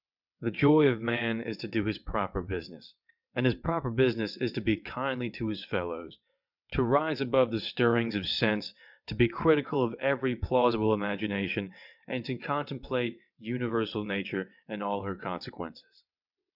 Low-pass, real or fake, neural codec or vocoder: 5.4 kHz; fake; vocoder, 22.05 kHz, 80 mel bands, WaveNeXt